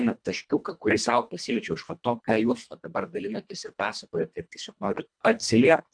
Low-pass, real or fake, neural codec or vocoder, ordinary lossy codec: 9.9 kHz; fake; codec, 24 kHz, 1.5 kbps, HILCodec; MP3, 96 kbps